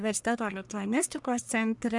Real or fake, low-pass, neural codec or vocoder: fake; 10.8 kHz; codec, 44.1 kHz, 1.7 kbps, Pupu-Codec